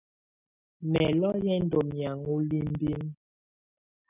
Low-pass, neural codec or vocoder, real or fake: 3.6 kHz; none; real